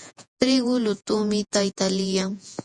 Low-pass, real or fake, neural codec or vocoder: 10.8 kHz; fake; vocoder, 48 kHz, 128 mel bands, Vocos